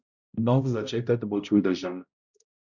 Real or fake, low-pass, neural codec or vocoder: fake; 7.2 kHz; codec, 16 kHz, 0.5 kbps, X-Codec, HuBERT features, trained on balanced general audio